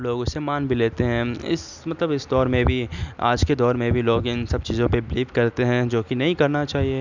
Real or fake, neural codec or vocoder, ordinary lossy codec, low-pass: real; none; none; 7.2 kHz